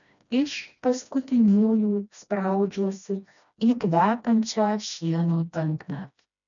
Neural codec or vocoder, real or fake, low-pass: codec, 16 kHz, 1 kbps, FreqCodec, smaller model; fake; 7.2 kHz